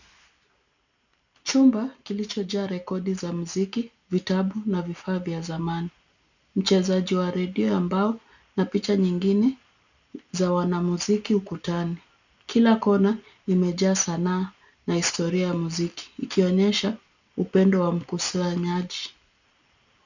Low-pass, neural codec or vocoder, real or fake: 7.2 kHz; none; real